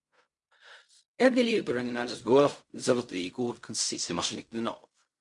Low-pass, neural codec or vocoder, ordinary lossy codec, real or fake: 10.8 kHz; codec, 16 kHz in and 24 kHz out, 0.4 kbps, LongCat-Audio-Codec, fine tuned four codebook decoder; MP3, 64 kbps; fake